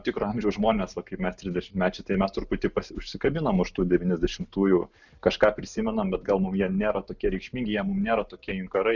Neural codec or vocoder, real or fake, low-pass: none; real; 7.2 kHz